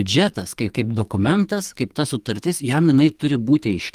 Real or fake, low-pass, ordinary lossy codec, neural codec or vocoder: fake; 14.4 kHz; Opus, 32 kbps; codec, 44.1 kHz, 2.6 kbps, SNAC